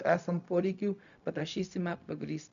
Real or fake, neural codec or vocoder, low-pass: fake; codec, 16 kHz, 0.4 kbps, LongCat-Audio-Codec; 7.2 kHz